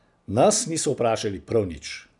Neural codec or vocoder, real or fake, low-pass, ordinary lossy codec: none; real; 10.8 kHz; none